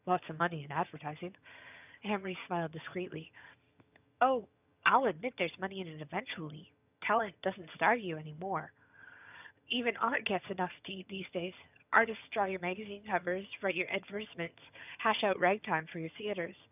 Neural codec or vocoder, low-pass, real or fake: vocoder, 22.05 kHz, 80 mel bands, HiFi-GAN; 3.6 kHz; fake